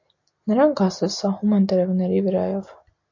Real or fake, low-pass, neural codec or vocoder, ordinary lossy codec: real; 7.2 kHz; none; AAC, 48 kbps